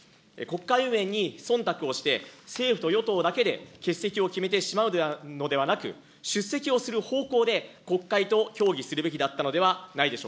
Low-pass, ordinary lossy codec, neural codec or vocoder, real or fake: none; none; none; real